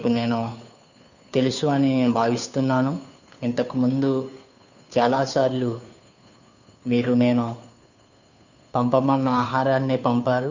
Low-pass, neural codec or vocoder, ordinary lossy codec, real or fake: 7.2 kHz; codec, 16 kHz, 2 kbps, FunCodec, trained on Chinese and English, 25 frames a second; none; fake